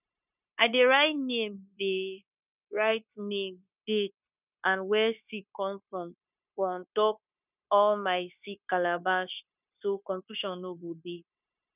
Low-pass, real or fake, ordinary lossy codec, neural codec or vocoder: 3.6 kHz; fake; none; codec, 16 kHz, 0.9 kbps, LongCat-Audio-Codec